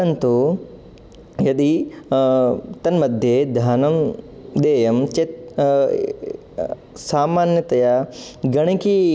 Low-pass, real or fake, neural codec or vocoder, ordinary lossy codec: none; real; none; none